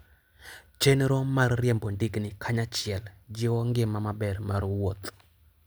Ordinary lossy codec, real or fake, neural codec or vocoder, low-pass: none; real; none; none